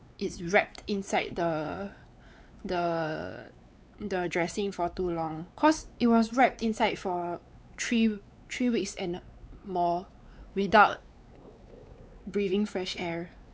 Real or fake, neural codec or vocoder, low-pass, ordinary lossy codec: fake; codec, 16 kHz, 4 kbps, X-Codec, WavLM features, trained on Multilingual LibriSpeech; none; none